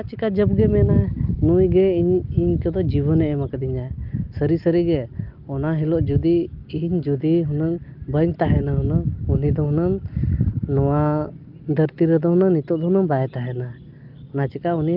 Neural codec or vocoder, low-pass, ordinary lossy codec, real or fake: none; 5.4 kHz; Opus, 32 kbps; real